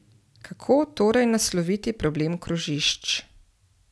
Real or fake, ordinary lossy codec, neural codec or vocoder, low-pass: real; none; none; none